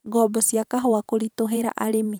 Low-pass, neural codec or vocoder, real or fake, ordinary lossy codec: none; vocoder, 44.1 kHz, 128 mel bands every 512 samples, BigVGAN v2; fake; none